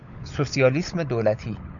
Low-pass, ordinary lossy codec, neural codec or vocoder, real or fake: 7.2 kHz; MP3, 96 kbps; codec, 16 kHz, 16 kbps, FunCodec, trained on LibriTTS, 50 frames a second; fake